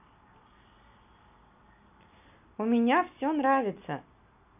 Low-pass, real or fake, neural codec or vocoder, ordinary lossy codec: 3.6 kHz; real; none; none